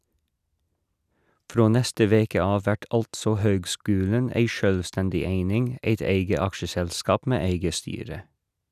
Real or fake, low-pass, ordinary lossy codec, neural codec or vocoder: real; 14.4 kHz; none; none